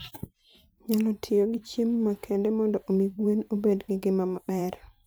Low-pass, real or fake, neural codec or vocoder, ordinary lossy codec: none; fake; vocoder, 44.1 kHz, 128 mel bands every 512 samples, BigVGAN v2; none